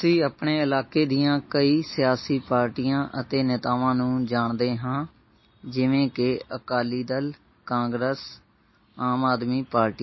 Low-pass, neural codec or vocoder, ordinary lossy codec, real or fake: 7.2 kHz; none; MP3, 24 kbps; real